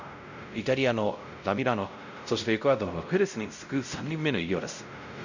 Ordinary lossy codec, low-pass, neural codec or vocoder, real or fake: none; 7.2 kHz; codec, 16 kHz, 0.5 kbps, X-Codec, WavLM features, trained on Multilingual LibriSpeech; fake